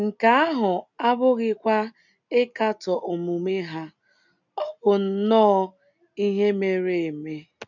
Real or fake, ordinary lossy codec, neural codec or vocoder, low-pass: real; none; none; 7.2 kHz